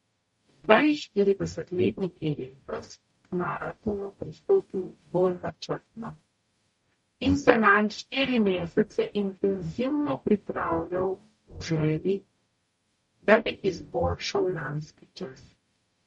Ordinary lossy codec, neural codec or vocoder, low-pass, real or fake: MP3, 48 kbps; codec, 44.1 kHz, 0.9 kbps, DAC; 19.8 kHz; fake